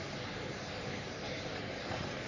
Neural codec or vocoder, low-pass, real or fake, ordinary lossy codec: codec, 44.1 kHz, 3.4 kbps, Pupu-Codec; 7.2 kHz; fake; none